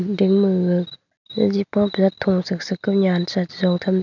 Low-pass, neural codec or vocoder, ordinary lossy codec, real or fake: 7.2 kHz; none; none; real